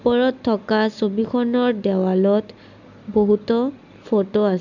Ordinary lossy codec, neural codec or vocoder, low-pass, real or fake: none; none; 7.2 kHz; real